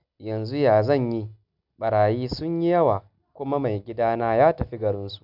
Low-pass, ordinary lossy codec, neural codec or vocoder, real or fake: 5.4 kHz; none; none; real